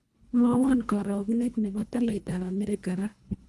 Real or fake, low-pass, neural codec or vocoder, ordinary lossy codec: fake; none; codec, 24 kHz, 1.5 kbps, HILCodec; none